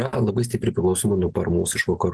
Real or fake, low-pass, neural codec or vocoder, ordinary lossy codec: real; 10.8 kHz; none; Opus, 16 kbps